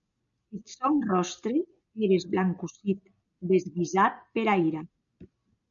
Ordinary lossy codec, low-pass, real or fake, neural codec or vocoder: MP3, 96 kbps; 7.2 kHz; real; none